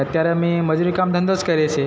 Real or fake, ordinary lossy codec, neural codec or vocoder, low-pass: real; none; none; none